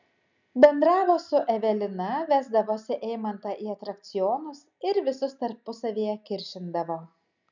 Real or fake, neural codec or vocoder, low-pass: real; none; 7.2 kHz